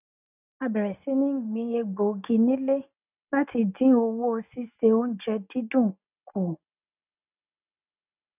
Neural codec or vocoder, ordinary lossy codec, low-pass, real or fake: none; none; 3.6 kHz; real